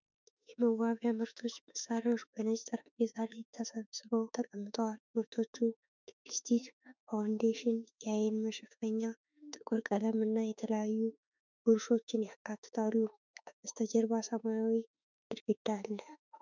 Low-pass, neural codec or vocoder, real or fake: 7.2 kHz; autoencoder, 48 kHz, 32 numbers a frame, DAC-VAE, trained on Japanese speech; fake